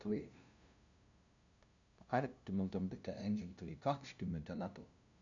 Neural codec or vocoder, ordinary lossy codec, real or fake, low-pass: codec, 16 kHz, 0.5 kbps, FunCodec, trained on LibriTTS, 25 frames a second; none; fake; 7.2 kHz